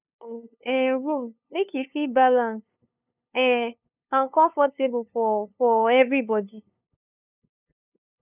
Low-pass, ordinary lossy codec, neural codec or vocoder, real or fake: 3.6 kHz; none; codec, 16 kHz, 2 kbps, FunCodec, trained on LibriTTS, 25 frames a second; fake